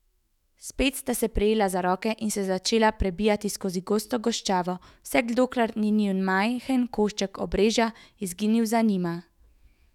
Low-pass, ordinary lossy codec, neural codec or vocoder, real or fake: 19.8 kHz; none; autoencoder, 48 kHz, 128 numbers a frame, DAC-VAE, trained on Japanese speech; fake